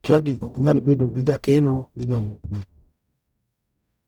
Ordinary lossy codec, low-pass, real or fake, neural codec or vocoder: none; 19.8 kHz; fake; codec, 44.1 kHz, 0.9 kbps, DAC